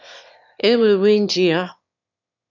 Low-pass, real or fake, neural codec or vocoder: 7.2 kHz; fake; autoencoder, 22.05 kHz, a latent of 192 numbers a frame, VITS, trained on one speaker